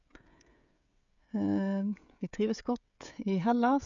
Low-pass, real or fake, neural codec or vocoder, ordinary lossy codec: 7.2 kHz; fake; codec, 16 kHz, 16 kbps, FreqCodec, smaller model; none